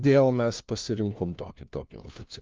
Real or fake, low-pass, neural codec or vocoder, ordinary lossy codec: fake; 7.2 kHz; codec, 16 kHz, 1 kbps, FunCodec, trained on LibriTTS, 50 frames a second; Opus, 24 kbps